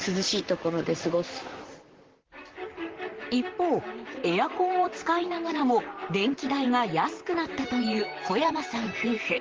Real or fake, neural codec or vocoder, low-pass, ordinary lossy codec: fake; vocoder, 44.1 kHz, 128 mel bands, Pupu-Vocoder; 7.2 kHz; Opus, 16 kbps